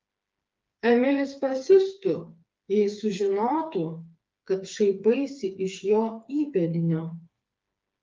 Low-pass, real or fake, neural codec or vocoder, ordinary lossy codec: 7.2 kHz; fake; codec, 16 kHz, 4 kbps, FreqCodec, smaller model; Opus, 24 kbps